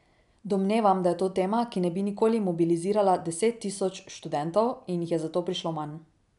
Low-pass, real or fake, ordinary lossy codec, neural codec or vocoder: 10.8 kHz; real; none; none